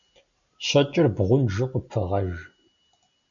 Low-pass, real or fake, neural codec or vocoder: 7.2 kHz; real; none